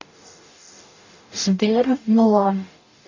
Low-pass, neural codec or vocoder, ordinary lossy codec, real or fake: 7.2 kHz; codec, 44.1 kHz, 0.9 kbps, DAC; AAC, 48 kbps; fake